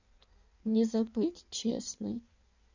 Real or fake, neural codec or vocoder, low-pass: fake; codec, 16 kHz in and 24 kHz out, 1.1 kbps, FireRedTTS-2 codec; 7.2 kHz